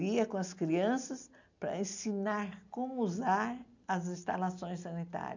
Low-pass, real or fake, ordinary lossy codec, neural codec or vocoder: 7.2 kHz; real; none; none